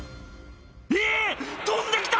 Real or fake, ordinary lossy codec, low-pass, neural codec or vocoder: real; none; none; none